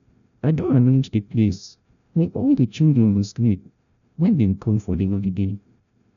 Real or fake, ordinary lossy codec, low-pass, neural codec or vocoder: fake; none; 7.2 kHz; codec, 16 kHz, 0.5 kbps, FreqCodec, larger model